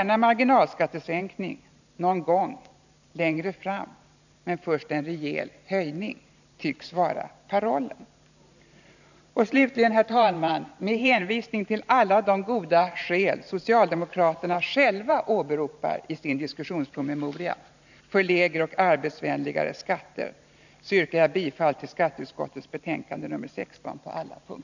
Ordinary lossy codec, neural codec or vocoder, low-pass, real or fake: none; vocoder, 44.1 kHz, 128 mel bands every 512 samples, BigVGAN v2; 7.2 kHz; fake